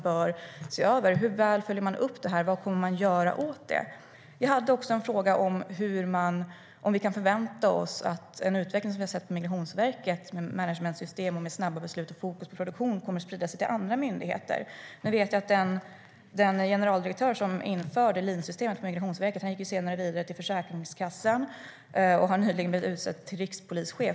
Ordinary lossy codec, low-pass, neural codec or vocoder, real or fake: none; none; none; real